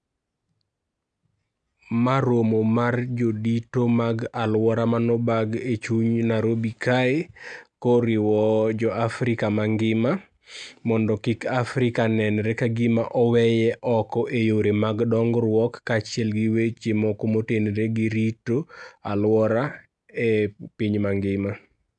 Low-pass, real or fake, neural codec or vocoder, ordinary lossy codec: 9.9 kHz; real; none; none